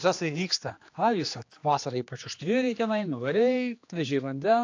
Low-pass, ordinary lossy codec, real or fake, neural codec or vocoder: 7.2 kHz; AAC, 48 kbps; fake; codec, 16 kHz, 2 kbps, X-Codec, HuBERT features, trained on general audio